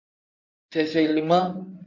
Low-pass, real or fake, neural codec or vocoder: 7.2 kHz; fake; codec, 16 kHz in and 24 kHz out, 2.2 kbps, FireRedTTS-2 codec